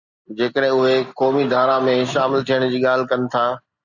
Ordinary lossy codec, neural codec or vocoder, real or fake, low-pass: Opus, 64 kbps; none; real; 7.2 kHz